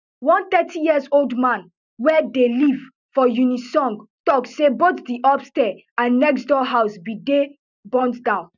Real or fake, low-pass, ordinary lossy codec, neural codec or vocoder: real; 7.2 kHz; none; none